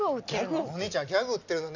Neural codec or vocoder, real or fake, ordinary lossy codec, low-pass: none; real; none; 7.2 kHz